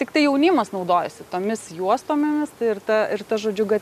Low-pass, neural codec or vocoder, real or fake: 14.4 kHz; none; real